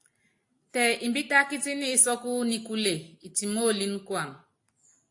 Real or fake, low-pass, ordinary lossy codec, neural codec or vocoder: real; 10.8 kHz; AAC, 64 kbps; none